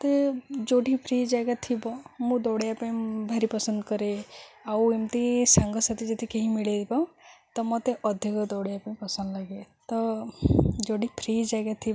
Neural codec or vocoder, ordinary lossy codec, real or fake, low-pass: none; none; real; none